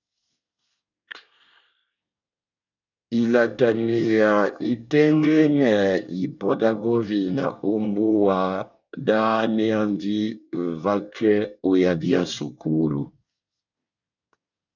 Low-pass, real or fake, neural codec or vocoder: 7.2 kHz; fake; codec, 24 kHz, 1 kbps, SNAC